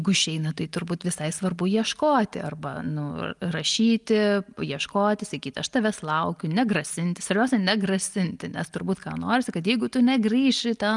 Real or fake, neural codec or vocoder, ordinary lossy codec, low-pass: real; none; Opus, 32 kbps; 10.8 kHz